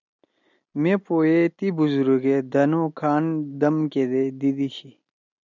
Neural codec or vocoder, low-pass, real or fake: none; 7.2 kHz; real